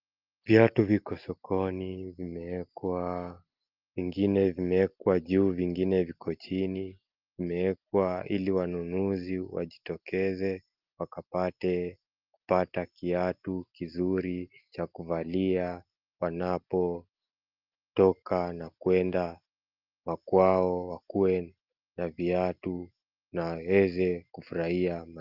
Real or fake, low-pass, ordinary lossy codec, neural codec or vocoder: real; 5.4 kHz; Opus, 32 kbps; none